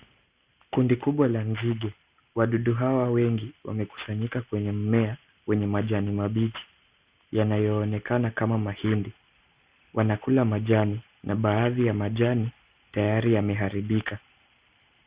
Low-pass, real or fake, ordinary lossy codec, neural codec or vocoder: 3.6 kHz; real; Opus, 64 kbps; none